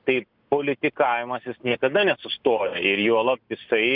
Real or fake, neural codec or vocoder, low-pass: real; none; 5.4 kHz